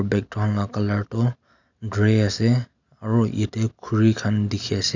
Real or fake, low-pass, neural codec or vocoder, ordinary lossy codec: real; 7.2 kHz; none; none